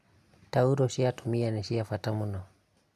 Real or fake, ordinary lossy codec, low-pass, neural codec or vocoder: real; none; 14.4 kHz; none